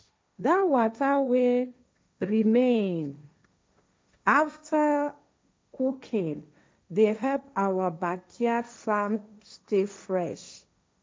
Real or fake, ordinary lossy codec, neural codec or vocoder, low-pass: fake; none; codec, 16 kHz, 1.1 kbps, Voila-Tokenizer; none